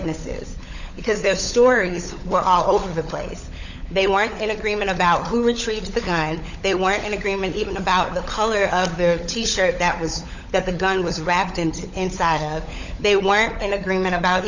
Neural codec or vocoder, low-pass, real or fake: codec, 16 kHz, 16 kbps, FunCodec, trained on LibriTTS, 50 frames a second; 7.2 kHz; fake